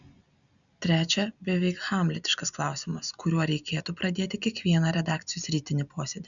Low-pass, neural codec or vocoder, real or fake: 7.2 kHz; none; real